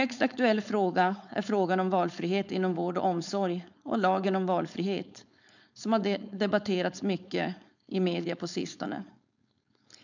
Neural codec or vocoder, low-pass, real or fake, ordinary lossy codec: codec, 16 kHz, 4.8 kbps, FACodec; 7.2 kHz; fake; none